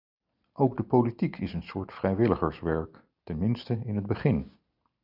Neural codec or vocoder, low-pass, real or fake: none; 5.4 kHz; real